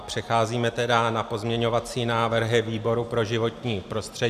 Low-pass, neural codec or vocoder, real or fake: 14.4 kHz; none; real